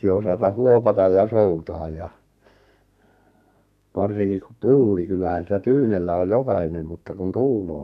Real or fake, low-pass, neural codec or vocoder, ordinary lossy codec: fake; 14.4 kHz; codec, 32 kHz, 1.9 kbps, SNAC; none